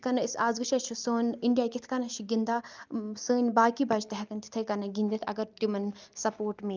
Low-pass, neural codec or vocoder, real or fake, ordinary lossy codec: 7.2 kHz; none; real; Opus, 24 kbps